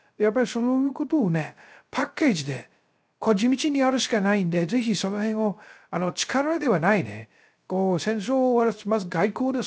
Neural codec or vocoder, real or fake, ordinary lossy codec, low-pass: codec, 16 kHz, 0.3 kbps, FocalCodec; fake; none; none